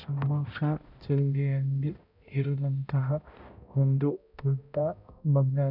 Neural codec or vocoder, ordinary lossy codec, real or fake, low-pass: codec, 16 kHz, 0.5 kbps, X-Codec, HuBERT features, trained on balanced general audio; none; fake; 5.4 kHz